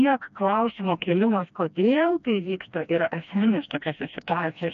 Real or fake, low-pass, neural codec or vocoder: fake; 7.2 kHz; codec, 16 kHz, 1 kbps, FreqCodec, smaller model